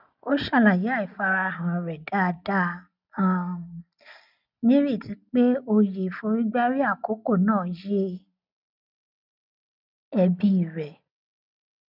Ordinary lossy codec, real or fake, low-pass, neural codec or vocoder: none; real; 5.4 kHz; none